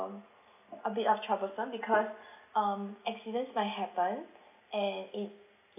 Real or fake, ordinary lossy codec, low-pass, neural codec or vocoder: real; none; 3.6 kHz; none